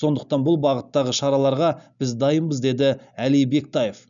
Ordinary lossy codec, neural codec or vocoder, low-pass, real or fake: none; none; 7.2 kHz; real